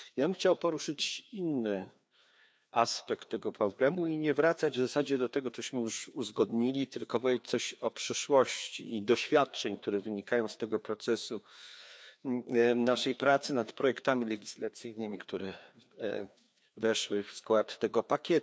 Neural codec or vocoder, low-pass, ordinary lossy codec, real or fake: codec, 16 kHz, 2 kbps, FreqCodec, larger model; none; none; fake